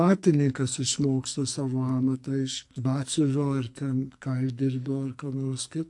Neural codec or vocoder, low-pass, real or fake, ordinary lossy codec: codec, 44.1 kHz, 2.6 kbps, SNAC; 10.8 kHz; fake; AAC, 64 kbps